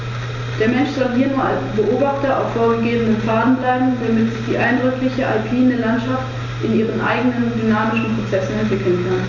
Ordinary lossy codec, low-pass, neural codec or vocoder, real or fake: none; 7.2 kHz; none; real